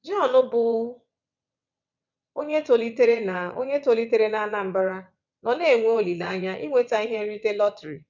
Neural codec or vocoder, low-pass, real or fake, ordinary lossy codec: vocoder, 22.05 kHz, 80 mel bands, WaveNeXt; 7.2 kHz; fake; none